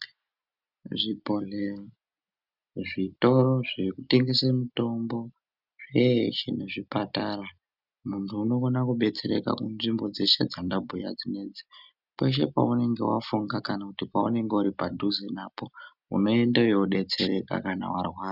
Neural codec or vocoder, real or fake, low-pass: none; real; 5.4 kHz